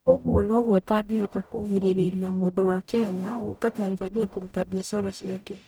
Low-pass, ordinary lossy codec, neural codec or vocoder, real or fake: none; none; codec, 44.1 kHz, 0.9 kbps, DAC; fake